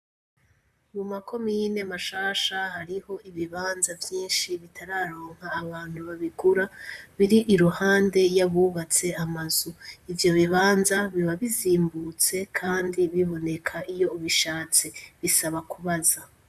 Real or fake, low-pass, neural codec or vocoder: fake; 14.4 kHz; vocoder, 44.1 kHz, 128 mel bands, Pupu-Vocoder